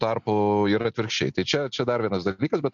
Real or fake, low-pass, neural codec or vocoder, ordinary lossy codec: real; 7.2 kHz; none; MP3, 64 kbps